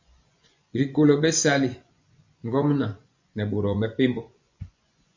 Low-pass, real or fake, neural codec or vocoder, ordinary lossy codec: 7.2 kHz; fake; vocoder, 24 kHz, 100 mel bands, Vocos; MP3, 64 kbps